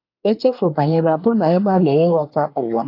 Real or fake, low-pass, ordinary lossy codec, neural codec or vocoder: fake; 5.4 kHz; AAC, 32 kbps; codec, 24 kHz, 1 kbps, SNAC